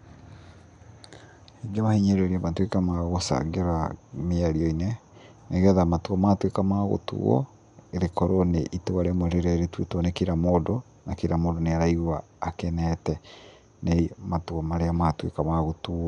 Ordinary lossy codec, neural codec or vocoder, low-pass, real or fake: none; none; 10.8 kHz; real